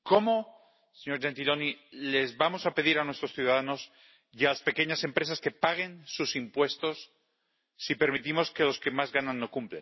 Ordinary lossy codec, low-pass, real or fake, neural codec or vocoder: MP3, 24 kbps; 7.2 kHz; real; none